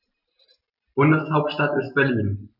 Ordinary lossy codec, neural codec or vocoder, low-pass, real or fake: none; none; 5.4 kHz; real